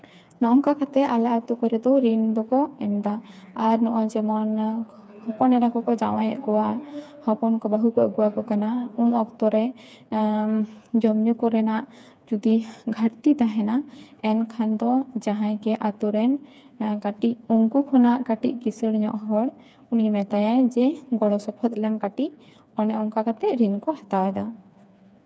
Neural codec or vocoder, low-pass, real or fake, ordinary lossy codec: codec, 16 kHz, 4 kbps, FreqCodec, smaller model; none; fake; none